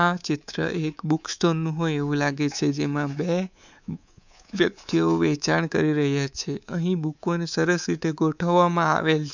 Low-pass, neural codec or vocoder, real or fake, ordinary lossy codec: 7.2 kHz; codec, 24 kHz, 3.1 kbps, DualCodec; fake; none